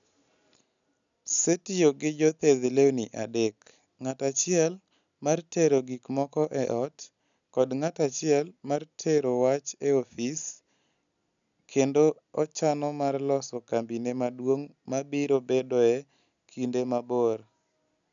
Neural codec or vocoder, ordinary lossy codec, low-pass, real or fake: none; none; 7.2 kHz; real